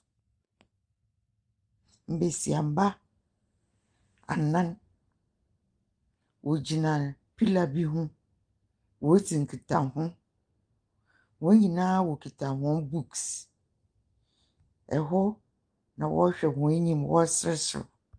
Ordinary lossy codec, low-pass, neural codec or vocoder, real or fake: Opus, 32 kbps; 9.9 kHz; none; real